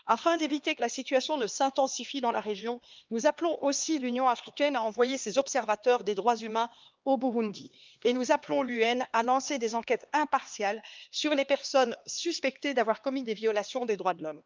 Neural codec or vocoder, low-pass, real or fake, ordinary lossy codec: codec, 16 kHz, 2 kbps, X-Codec, HuBERT features, trained on LibriSpeech; 7.2 kHz; fake; Opus, 24 kbps